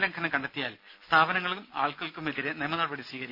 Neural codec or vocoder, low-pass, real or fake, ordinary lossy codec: none; 5.4 kHz; real; none